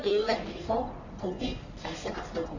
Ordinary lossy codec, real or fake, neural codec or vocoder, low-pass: none; fake; codec, 44.1 kHz, 1.7 kbps, Pupu-Codec; 7.2 kHz